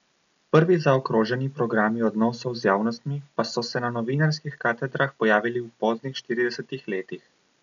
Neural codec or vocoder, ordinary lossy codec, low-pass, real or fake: none; none; 7.2 kHz; real